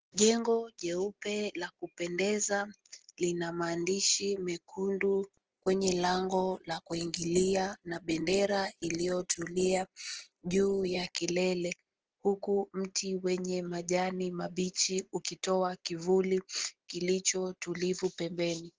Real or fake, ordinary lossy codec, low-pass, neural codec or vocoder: real; Opus, 16 kbps; 7.2 kHz; none